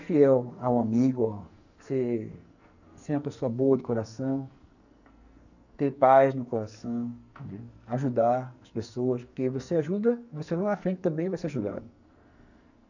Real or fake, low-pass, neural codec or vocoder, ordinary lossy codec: fake; 7.2 kHz; codec, 44.1 kHz, 2.6 kbps, SNAC; none